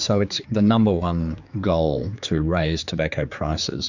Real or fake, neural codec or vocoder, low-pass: fake; codec, 16 kHz, 4 kbps, X-Codec, HuBERT features, trained on general audio; 7.2 kHz